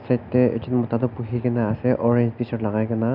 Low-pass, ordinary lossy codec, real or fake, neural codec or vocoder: 5.4 kHz; none; real; none